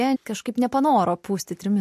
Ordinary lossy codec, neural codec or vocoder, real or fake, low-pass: MP3, 64 kbps; none; real; 14.4 kHz